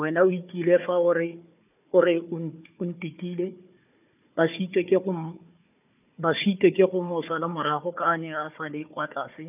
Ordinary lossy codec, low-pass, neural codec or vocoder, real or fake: none; 3.6 kHz; codec, 16 kHz, 4 kbps, FunCodec, trained on Chinese and English, 50 frames a second; fake